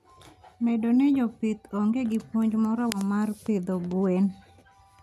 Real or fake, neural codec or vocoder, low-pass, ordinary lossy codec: real; none; 14.4 kHz; none